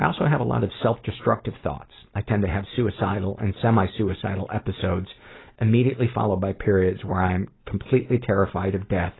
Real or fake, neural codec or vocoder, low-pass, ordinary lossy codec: real; none; 7.2 kHz; AAC, 16 kbps